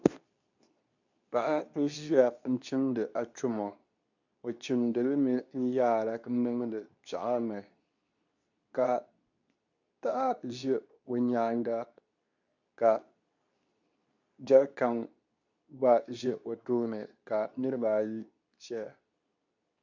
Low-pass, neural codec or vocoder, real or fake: 7.2 kHz; codec, 24 kHz, 0.9 kbps, WavTokenizer, medium speech release version 2; fake